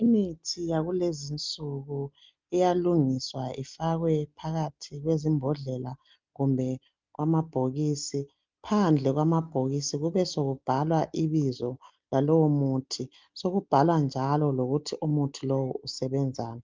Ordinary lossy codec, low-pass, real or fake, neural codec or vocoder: Opus, 24 kbps; 7.2 kHz; real; none